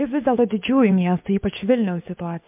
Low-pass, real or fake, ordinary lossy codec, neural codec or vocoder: 3.6 kHz; fake; MP3, 32 kbps; codec, 16 kHz, 6 kbps, DAC